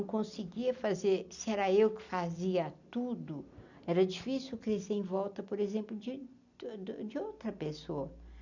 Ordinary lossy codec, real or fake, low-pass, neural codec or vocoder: none; real; 7.2 kHz; none